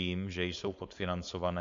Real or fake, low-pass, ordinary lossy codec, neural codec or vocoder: fake; 7.2 kHz; MP3, 64 kbps; codec, 16 kHz, 4.8 kbps, FACodec